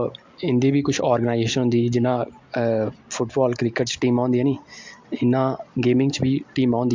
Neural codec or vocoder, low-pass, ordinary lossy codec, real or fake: none; 7.2 kHz; MP3, 64 kbps; real